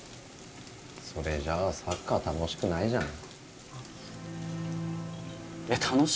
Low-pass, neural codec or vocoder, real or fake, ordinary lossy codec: none; none; real; none